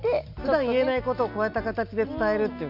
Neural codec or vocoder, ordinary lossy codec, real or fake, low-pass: none; none; real; 5.4 kHz